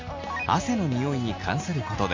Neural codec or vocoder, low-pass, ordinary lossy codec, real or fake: none; 7.2 kHz; MP3, 48 kbps; real